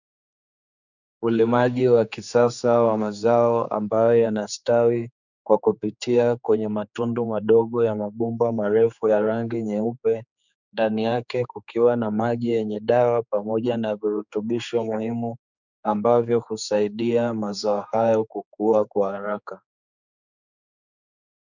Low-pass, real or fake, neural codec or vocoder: 7.2 kHz; fake; codec, 16 kHz, 4 kbps, X-Codec, HuBERT features, trained on general audio